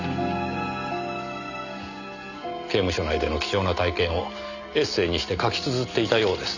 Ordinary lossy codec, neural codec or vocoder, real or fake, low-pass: none; none; real; 7.2 kHz